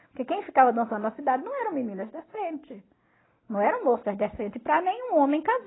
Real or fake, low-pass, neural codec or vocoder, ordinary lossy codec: real; 7.2 kHz; none; AAC, 16 kbps